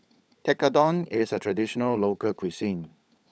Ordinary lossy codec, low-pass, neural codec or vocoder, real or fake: none; none; codec, 16 kHz, 16 kbps, FunCodec, trained on LibriTTS, 50 frames a second; fake